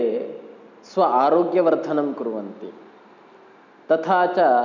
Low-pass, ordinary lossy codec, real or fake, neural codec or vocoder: 7.2 kHz; none; real; none